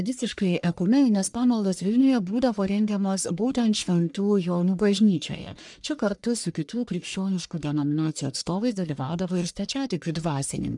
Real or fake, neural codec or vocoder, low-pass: fake; codec, 44.1 kHz, 1.7 kbps, Pupu-Codec; 10.8 kHz